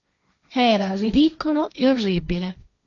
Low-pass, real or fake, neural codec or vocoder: 7.2 kHz; fake; codec, 16 kHz, 1.1 kbps, Voila-Tokenizer